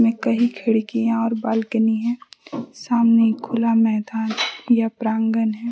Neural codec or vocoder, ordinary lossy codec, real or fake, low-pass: none; none; real; none